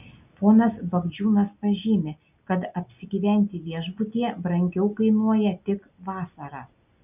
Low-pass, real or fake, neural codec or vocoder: 3.6 kHz; real; none